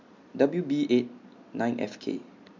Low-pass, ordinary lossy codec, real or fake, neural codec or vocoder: 7.2 kHz; MP3, 48 kbps; real; none